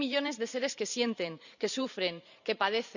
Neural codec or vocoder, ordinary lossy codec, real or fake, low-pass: vocoder, 44.1 kHz, 128 mel bands every 512 samples, BigVGAN v2; none; fake; 7.2 kHz